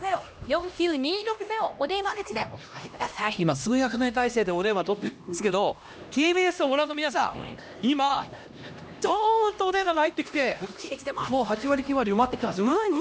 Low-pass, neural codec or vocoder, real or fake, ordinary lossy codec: none; codec, 16 kHz, 1 kbps, X-Codec, HuBERT features, trained on LibriSpeech; fake; none